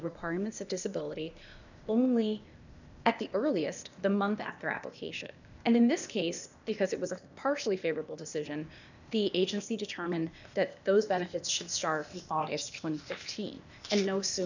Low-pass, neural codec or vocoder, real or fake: 7.2 kHz; codec, 16 kHz, 0.8 kbps, ZipCodec; fake